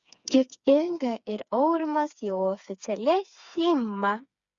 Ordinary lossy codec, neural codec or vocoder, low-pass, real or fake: Opus, 64 kbps; codec, 16 kHz, 4 kbps, FreqCodec, smaller model; 7.2 kHz; fake